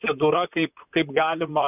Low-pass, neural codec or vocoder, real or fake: 3.6 kHz; vocoder, 44.1 kHz, 128 mel bands, Pupu-Vocoder; fake